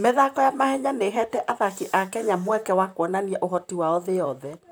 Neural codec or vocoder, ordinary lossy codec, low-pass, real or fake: vocoder, 44.1 kHz, 128 mel bands, Pupu-Vocoder; none; none; fake